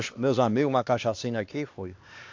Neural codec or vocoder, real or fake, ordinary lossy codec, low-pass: codec, 16 kHz, 2 kbps, X-Codec, HuBERT features, trained on LibriSpeech; fake; AAC, 48 kbps; 7.2 kHz